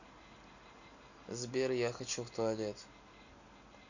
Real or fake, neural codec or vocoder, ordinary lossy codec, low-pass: real; none; none; 7.2 kHz